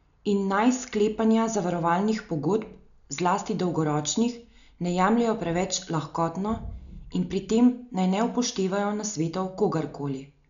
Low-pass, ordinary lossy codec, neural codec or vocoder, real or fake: 7.2 kHz; none; none; real